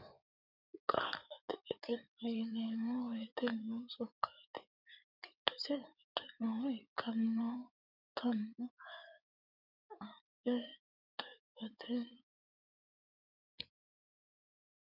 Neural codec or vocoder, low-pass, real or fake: codec, 16 kHz in and 24 kHz out, 2.2 kbps, FireRedTTS-2 codec; 5.4 kHz; fake